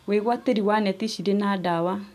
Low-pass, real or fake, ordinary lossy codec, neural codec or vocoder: 14.4 kHz; real; none; none